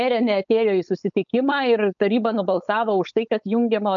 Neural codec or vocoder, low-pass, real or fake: codec, 16 kHz, 4.8 kbps, FACodec; 7.2 kHz; fake